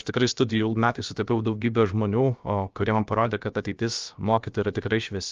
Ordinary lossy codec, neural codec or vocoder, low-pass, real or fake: Opus, 32 kbps; codec, 16 kHz, about 1 kbps, DyCAST, with the encoder's durations; 7.2 kHz; fake